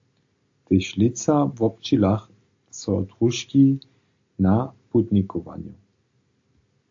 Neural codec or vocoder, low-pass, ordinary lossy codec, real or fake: none; 7.2 kHz; AAC, 48 kbps; real